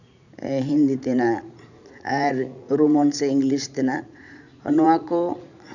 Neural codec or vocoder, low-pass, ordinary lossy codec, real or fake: vocoder, 44.1 kHz, 80 mel bands, Vocos; 7.2 kHz; none; fake